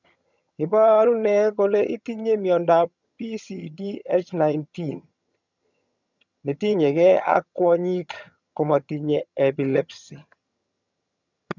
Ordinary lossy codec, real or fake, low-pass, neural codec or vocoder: none; fake; 7.2 kHz; vocoder, 22.05 kHz, 80 mel bands, HiFi-GAN